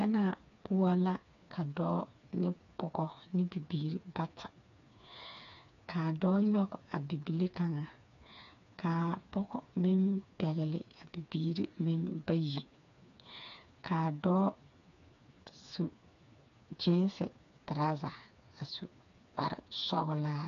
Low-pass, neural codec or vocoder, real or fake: 7.2 kHz; codec, 16 kHz, 4 kbps, FreqCodec, smaller model; fake